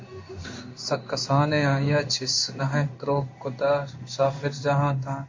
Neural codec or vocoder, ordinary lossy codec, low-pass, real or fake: codec, 16 kHz in and 24 kHz out, 1 kbps, XY-Tokenizer; MP3, 48 kbps; 7.2 kHz; fake